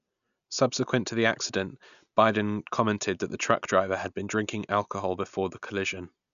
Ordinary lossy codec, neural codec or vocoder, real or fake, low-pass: none; none; real; 7.2 kHz